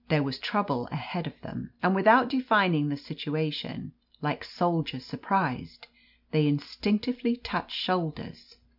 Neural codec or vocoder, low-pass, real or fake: none; 5.4 kHz; real